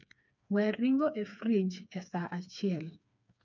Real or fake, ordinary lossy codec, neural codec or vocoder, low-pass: fake; none; codec, 16 kHz, 4 kbps, FreqCodec, smaller model; 7.2 kHz